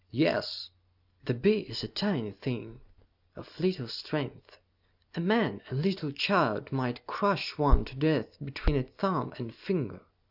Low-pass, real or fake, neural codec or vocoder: 5.4 kHz; real; none